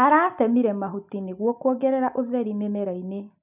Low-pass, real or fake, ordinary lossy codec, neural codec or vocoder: 3.6 kHz; real; none; none